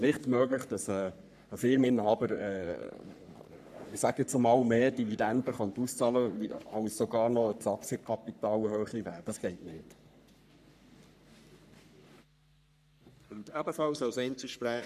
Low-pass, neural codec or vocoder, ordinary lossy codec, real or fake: 14.4 kHz; codec, 44.1 kHz, 3.4 kbps, Pupu-Codec; none; fake